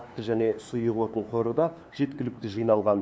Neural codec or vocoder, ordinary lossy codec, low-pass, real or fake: codec, 16 kHz, 2 kbps, FunCodec, trained on LibriTTS, 25 frames a second; none; none; fake